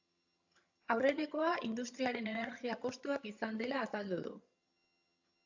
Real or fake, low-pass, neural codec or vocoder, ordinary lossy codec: fake; 7.2 kHz; vocoder, 22.05 kHz, 80 mel bands, HiFi-GAN; AAC, 48 kbps